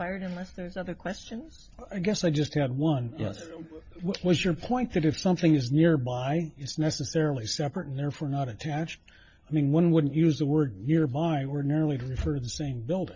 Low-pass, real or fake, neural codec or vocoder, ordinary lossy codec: 7.2 kHz; real; none; MP3, 48 kbps